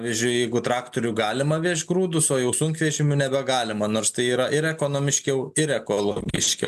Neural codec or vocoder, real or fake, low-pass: none; real; 14.4 kHz